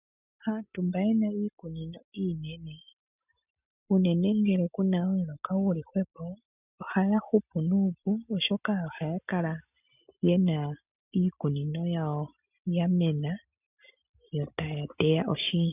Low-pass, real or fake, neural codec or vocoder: 3.6 kHz; real; none